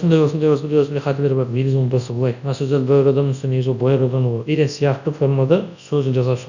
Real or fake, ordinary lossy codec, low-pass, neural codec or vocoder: fake; none; 7.2 kHz; codec, 24 kHz, 0.9 kbps, WavTokenizer, large speech release